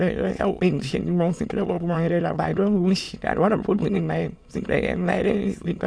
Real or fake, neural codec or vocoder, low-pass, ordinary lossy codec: fake; autoencoder, 22.05 kHz, a latent of 192 numbers a frame, VITS, trained on many speakers; none; none